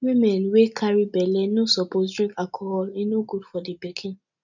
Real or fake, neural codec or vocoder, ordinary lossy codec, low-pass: real; none; none; 7.2 kHz